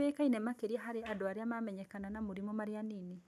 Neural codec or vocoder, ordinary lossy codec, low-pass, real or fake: none; none; 14.4 kHz; real